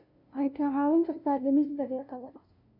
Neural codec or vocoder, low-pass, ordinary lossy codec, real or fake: codec, 16 kHz, 0.5 kbps, FunCodec, trained on LibriTTS, 25 frames a second; 5.4 kHz; none; fake